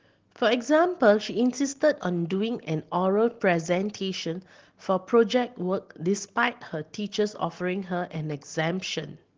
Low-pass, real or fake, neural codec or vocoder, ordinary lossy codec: 7.2 kHz; real; none; Opus, 16 kbps